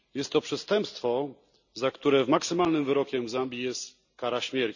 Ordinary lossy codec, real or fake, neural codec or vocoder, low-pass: none; real; none; 7.2 kHz